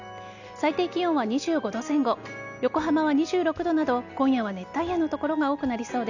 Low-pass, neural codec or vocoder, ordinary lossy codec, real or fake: 7.2 kHz; none; none; real